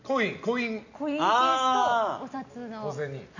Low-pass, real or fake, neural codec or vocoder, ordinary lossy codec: 7.2 kHz; real; none; none